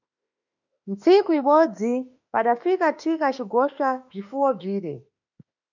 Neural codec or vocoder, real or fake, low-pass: autoencoder, 48 kHz, 32 numbers a frame, DAC-VAE, trained on Japanese speech; fake; 7.2 kHz